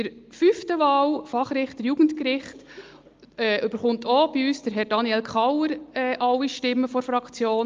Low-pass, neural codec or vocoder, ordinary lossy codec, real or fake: 7.2 kHz; none; Opus, 32 kbps; real